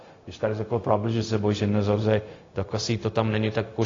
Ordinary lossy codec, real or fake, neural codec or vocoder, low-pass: AAC, 32 kbps; fake; codec, 16 kHz, 0.4 kbps, LongCat-Audio-Codec; 7.2 kHz